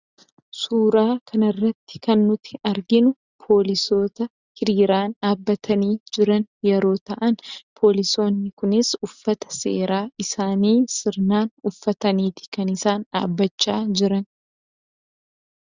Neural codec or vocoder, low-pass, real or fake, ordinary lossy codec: none; 7.2 kHz; real; Opus, 64 kbps